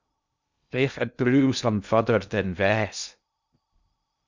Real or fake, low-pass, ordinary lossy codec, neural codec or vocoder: fake; 7.2 kHz; Opus, 64 kbps; codec, 16 kHz in and 24 kHz out, 0.6 kbps, FocalCodec, streaming, 2048 codes